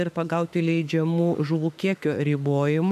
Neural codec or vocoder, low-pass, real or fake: autoencoder, 48 kHz, 32 numbers a frame, DAC-VAE, trained on Japanese speech; 14.4 kHz; fake